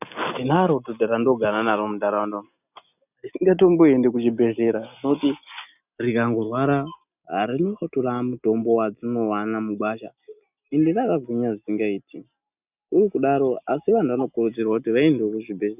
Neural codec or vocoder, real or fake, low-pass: none; real; 3.6 kHz